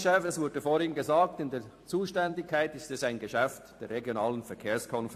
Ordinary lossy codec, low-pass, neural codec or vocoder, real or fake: AAC, 64 kbps; 14.4 kHz; vocoder, 44.1 kHz, 128 mel bands every 256 samples, BigVGAN v2; fake